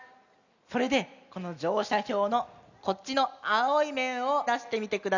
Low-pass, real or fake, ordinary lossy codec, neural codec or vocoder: 7.2 kHz; real; none; none